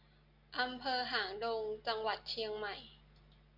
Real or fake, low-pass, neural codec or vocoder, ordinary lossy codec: real; 5.4 kHz; none; MP3, 48 kbps